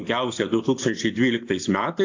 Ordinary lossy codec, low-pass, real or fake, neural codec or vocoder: AAC, 48 kbps; 7.2 kHz; real; none